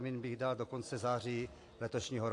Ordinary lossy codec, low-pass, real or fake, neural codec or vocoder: AAC, 48 kbps; 10.8 kHz; real; none